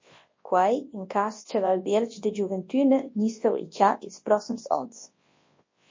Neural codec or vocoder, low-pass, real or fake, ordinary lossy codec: codec, 24 kHz, 0.9 kbps, WavTokenizer, large speech release; 7.2 kHz; fake; MP3, 32 kbps